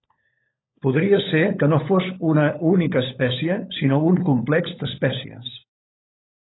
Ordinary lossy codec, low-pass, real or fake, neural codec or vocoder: AAC, 16 kbps; 7.2 kHz; fake; codec, 16 kHz, 16 kbps, FunCodec, trained on LibriTTS, 50 frames a second